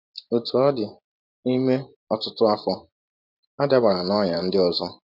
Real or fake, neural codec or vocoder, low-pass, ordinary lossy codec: fake; vocoder, 44.1 kHz, 128 mel bands every 512 samples, BigVGAN v2; 5.4 kHz; none